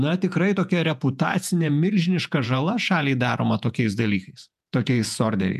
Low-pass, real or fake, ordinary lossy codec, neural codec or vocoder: 14.4 kHz; fake; MP3, 96 kbps; vocoder, 48 kHz, 128 mel bands, Vocos